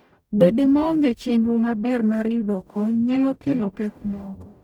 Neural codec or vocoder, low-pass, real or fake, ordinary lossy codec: codec, 44.1 kHz, 0.9 kbps, DAC; 19.8 kHz; fake; none